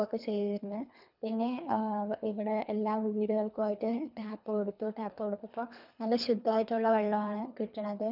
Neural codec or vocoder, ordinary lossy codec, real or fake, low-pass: codec, 24 kHz, 3 kbps, HILCodec; none; fake; 5.4 kHz